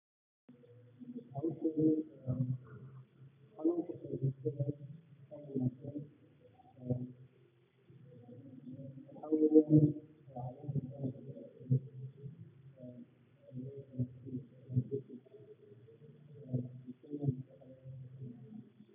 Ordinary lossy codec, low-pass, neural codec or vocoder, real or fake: none; 3.6 kHz; none; real